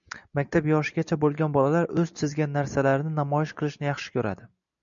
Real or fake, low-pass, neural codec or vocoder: real; 7.2 kHz; none